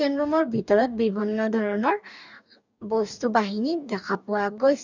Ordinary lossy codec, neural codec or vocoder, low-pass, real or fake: none; codec, 44.1 kHz, 2.6 kbps, DAC; 7.2 kHz; fake